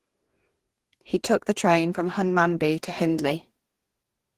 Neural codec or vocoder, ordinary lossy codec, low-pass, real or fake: codec, 44.1 kHz, 2.6 kbps, DAC; Opus, 16 kbps; 14.4 kHz; fake